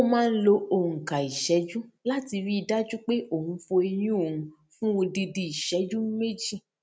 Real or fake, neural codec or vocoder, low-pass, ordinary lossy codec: real; none; none; none